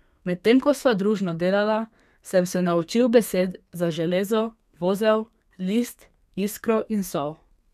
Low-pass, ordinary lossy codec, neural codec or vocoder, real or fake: 14.4 kHz; none; codec, 32 kHz, 1.9 kbps, SNAC; fake